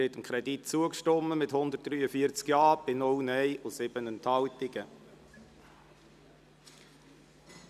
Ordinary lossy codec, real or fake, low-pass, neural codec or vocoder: none; real; 14.4 kHz; none